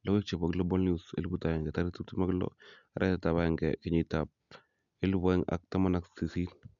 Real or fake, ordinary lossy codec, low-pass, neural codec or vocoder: real; none; 7.2 kHz; none